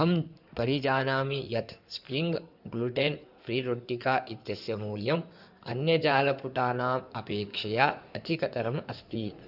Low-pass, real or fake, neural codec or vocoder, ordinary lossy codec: 5.4 kHz; fake; codec, 16 kHz in and 24 kHz out, 2.2 kbps, FireRedTTS-2 codec; none